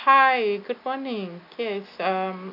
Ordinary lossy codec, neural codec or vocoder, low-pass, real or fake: none; none; 5.4 kHz; real